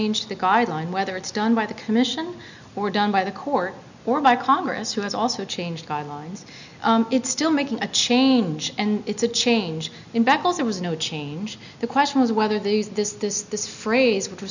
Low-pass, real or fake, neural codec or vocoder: 7.2 kHz; real; none